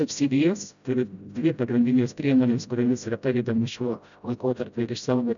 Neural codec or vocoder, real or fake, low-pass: codec, 16 kHz, 0.5 kbps, FreqCodec, smaller model; fake; 7.2 kHz